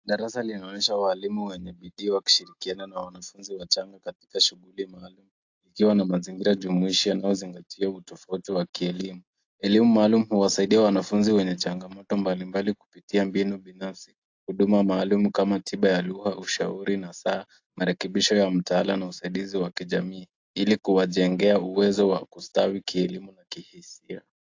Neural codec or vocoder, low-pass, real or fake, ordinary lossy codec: none; 7.2 kHz; real; AAC, 48 kbps